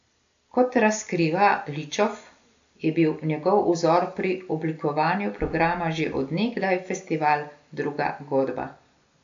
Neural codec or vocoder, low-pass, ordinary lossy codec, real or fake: none; 7.2 kHz; AAC, 64 kbps; real